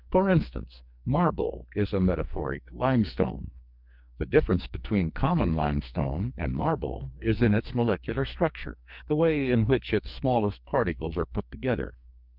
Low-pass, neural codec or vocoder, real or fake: 5.4 kHz; codec, 44.1 kHz, 2.6 kbps, SNAC; fake